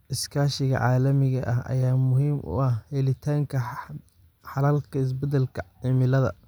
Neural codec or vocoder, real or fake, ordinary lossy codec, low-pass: none; real; none; none